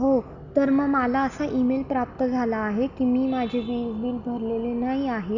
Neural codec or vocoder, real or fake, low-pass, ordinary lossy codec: none; real; 7.2 kHz; none